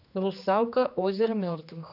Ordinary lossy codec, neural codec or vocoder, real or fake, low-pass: none; codec, 16 kHz, 2 kbps, X-Codec, HuBERT features, trained on general audio; fake; 5.4 kHz